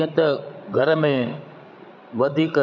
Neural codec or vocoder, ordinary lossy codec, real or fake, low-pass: codec, 16 kHz, 16 kbps, FreqCodec, larger model; none; fake; 7.2 kHz